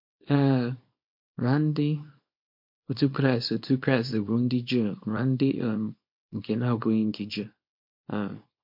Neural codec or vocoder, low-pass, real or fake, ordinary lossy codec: codec, 24 kHz, 0.9 kbps, WavTokenizer, small release; 5.4 kHz; fake; MP3, 32 kbps